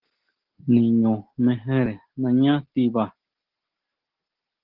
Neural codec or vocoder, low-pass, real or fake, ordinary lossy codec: none; 5.4 kHz; real; Opus, 16 kbps